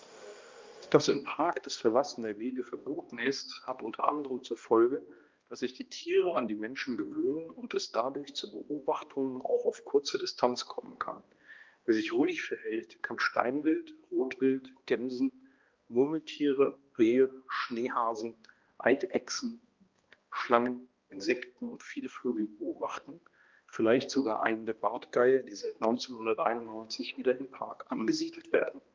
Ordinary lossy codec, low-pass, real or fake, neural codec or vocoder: Opus, 32 kbps; 7.2 kHz; fake; codec, 16 kHz, 1 kbps, X-Codec, HuBERT features, trained on balanced general audio